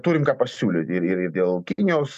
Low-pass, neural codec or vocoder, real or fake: 14.4 kHz; none; real